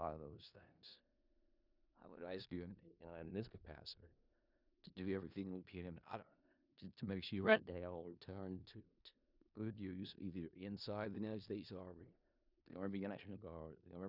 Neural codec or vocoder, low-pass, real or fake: codec, 16 kHz in and 24 kHz out, 0.4 kbps, LongCat-Audio-Codec, four codebook decoder; 5.4 kHz; fake